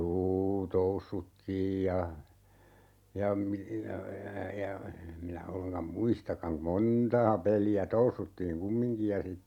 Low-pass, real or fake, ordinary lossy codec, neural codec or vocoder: 19.8 kHz; real; none; none